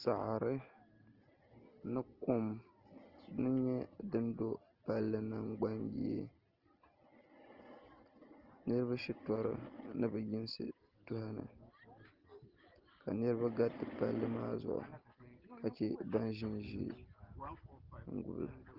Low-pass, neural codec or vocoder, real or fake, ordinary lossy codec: 5.4 kHz; none; real; Opus, 16 kbps